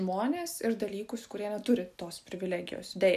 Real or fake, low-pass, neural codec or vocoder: real; 14.4 kHz; none